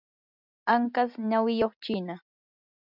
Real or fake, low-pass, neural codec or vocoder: real; 5.4 kHz; none